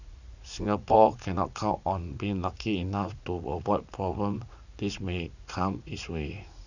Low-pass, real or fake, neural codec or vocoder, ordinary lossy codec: 7.2 kHz; fake; vocoder, 44.1 kHz, 80 mel bands, Vocos; none